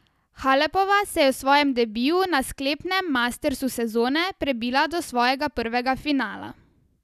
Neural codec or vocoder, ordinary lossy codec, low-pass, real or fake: none; none; 14.4 kHz; real